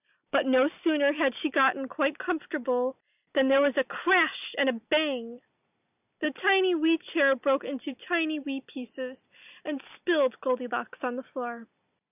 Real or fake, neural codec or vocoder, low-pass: real; none; 3.6 kHz